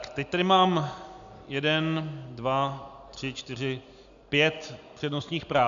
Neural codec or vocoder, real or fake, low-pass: none; real; 7.2 kHz